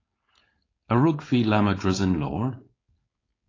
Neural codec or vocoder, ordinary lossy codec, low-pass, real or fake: codec, 16 kHz, 4.8 kbps, FACodec; AAC, 32 kbps; 7.2 kHz; fake